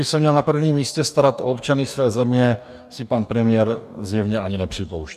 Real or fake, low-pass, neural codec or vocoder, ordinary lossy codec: fake; 14.4 kHz; codec, 44.1 kHz, 2.6 kbps, DAC; MP3, 96 kbps